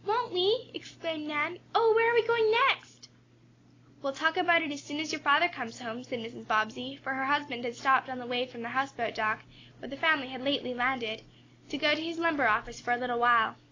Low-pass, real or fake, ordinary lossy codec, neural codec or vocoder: 7.2 kHz; real; AAC, 32 kbps; none